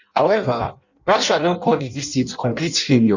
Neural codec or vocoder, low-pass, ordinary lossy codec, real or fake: codec, 16 kHz in and 24 kHz out, 0.6 kbps, FireRedTTS-2 codec; 7.2 kHz; none; fake